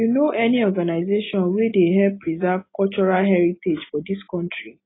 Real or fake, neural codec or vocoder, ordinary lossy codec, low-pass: real; none; AAC, 16 kbps; 7.2 kHz